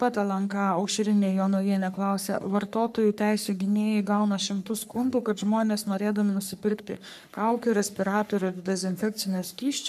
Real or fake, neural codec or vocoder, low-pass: fake; codec, 44.1 kHz, 3.4 kbps, Pupu-Codec; 14.4 kHz